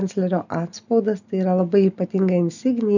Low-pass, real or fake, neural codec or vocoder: 7.2 kHz; real; none